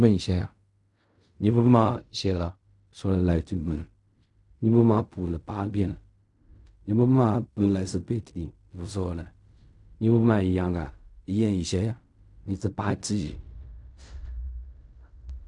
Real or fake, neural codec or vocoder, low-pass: fake; codec, 16 kHz in and 24 kHz out, 0.4 kbps, LongCat-Audio-Codec, fine tuned four codebook decoder; 10.8 kHz